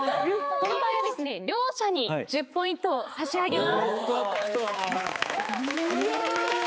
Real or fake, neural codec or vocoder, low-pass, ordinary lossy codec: fake; codec, 16 kHz, 4 kbps, X-Codec, HuBERT features, trained on balanced general audio; none; none